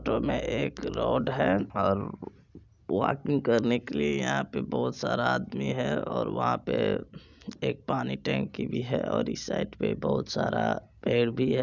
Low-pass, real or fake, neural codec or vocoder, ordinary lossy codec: 7.2 kHz; real; none; none